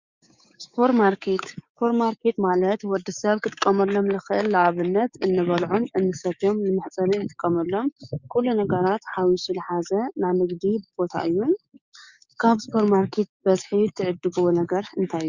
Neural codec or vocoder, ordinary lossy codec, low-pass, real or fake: codec, 16 kHz, 6 kbps, DAC; Opus, 64 kbps; 7.2 kHz; fake